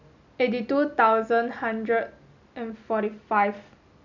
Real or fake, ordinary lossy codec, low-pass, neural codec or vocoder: real; none; 7.2 kHz; none